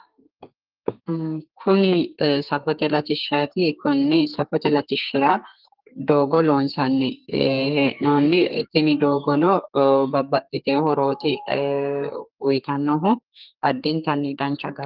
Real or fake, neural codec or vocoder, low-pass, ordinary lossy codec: fake; codec, 32 kHz, 1.9 kbps, SNAC; 5.4 kHz; Opus, 32 kbps